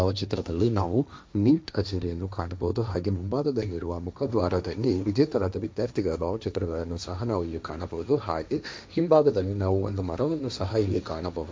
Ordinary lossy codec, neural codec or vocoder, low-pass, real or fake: none; codec, 16 kHz, 1.1 kbps, Voila-Tokenizer; none; fake